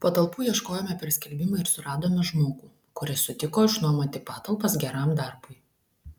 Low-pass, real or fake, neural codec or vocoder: 19.8 kHz; real; none